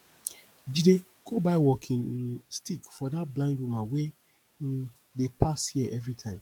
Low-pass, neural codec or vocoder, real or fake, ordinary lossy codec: 19.8 kHz; codec, 44.1 kHz, 7.8 kbps, DAC; fake; MP3, 96 kbps